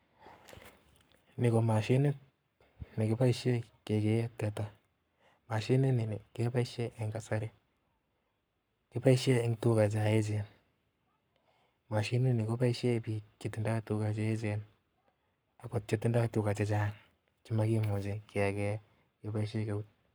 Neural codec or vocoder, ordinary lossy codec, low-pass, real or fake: codec, 44.1 kHz, 7.8 kbps, Pupu-Codec; none; none; fake